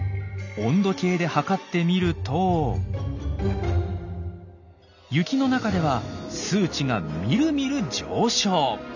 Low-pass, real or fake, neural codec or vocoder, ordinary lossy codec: 7.2 kHz; real; none; none